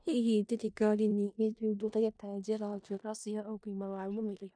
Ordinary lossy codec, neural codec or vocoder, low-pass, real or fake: none; codec, 16 kHz in and 24 kHz out, 0.4 kbps, LongCat-Audio-Codec, four codebook decoder; 9.9 kHz; fake